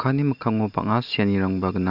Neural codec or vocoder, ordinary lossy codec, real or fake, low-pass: none; none; real; 5.4 kHz